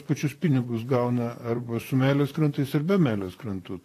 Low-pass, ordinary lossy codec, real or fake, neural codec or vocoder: 14.4 kHz; AAC, 48 kbps; fake; vocoder, 44.1 kHz, 128 mel bands, Pupu-Vocoder